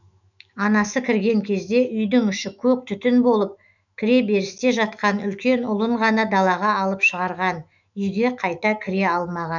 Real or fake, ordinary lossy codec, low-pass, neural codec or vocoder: fake; none; 7.2 kHz; autoencoder, 48 kHz, 128 numbers a frame, DAC-VAE, trained on Japanese speech